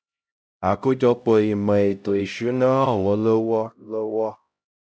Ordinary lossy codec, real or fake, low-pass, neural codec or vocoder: none; fake; none; codec, 16 kHz, 0.5 kbps, X-Codec, HuBERT features, trained on LibriSpeech